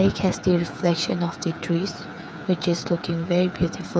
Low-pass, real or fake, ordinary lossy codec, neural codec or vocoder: none; fake; none; codec, 16 kHz, 8 kbps, FreqCodec, smaller model